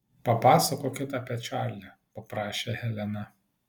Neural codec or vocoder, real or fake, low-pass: none; real; 19.8 kHz